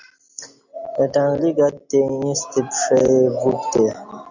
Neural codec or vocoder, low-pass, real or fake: none; 7.2 kHz; real